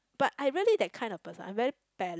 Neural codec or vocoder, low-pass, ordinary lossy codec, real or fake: none; none; none; real